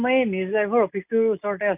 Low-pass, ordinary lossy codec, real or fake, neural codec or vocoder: 3.6 kHz; none; real; none